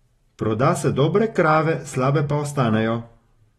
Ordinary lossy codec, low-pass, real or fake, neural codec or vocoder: AAC, 32 kbps; 19.8 kHz; real; none